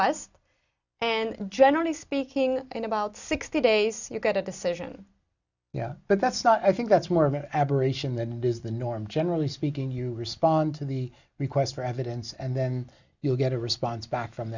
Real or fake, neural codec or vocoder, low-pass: real; none; 7.2 kHz